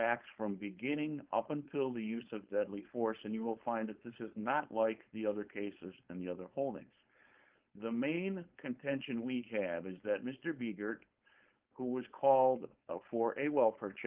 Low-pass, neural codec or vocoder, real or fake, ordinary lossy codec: 3.6 kHz; codec, 16 kHz, 4.8 kbps, FACodec; fake; Opus, 32 kbps